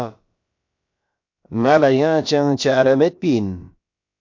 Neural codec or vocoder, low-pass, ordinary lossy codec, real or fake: codec, 16 kHz, about 1 kbps, DyCAST, with the encoder's durations; 7.2 kHz; MP3, 48 kbps; fake